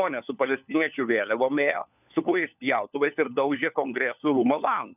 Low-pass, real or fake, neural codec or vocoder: 3.6 kHz; fake; codec, 16 kHz, 4 kbps, FunCodec, trained on LibriTTS, 50 frames a second